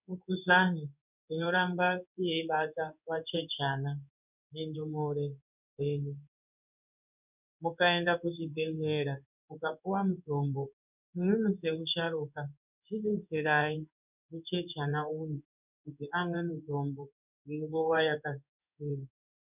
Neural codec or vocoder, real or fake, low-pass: codec, 16 kHz in and 24 kHz out, 1 kbps, XY-Tokenizer; fake; 3.6 kHz